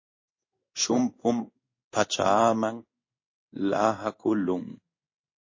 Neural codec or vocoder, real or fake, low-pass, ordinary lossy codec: codec, 16 kHz in and 24 kHz out, 1 kbps, XY-Tokenizer; fake; 7.2 kHz; MP3, 32 kbps